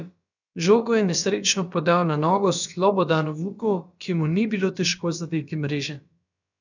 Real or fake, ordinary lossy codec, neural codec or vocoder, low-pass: fake; none; codec, 16 kHz, about 1 kbps, DyCAST, with the encoder's durations; 7.2 kHz